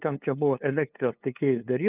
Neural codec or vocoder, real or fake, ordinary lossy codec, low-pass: codec, 16 kHz, 2 kbps, FunCodec, trained on LibriTTS, 25 frames a second; fake; Opus, 32 kbps; 3.6 kHz